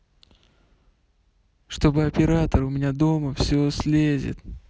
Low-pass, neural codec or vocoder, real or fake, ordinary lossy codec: none; none; real; none